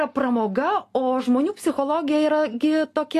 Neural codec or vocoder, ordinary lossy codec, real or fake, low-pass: none; AAC, 48 kbps; real; 14.4 kHz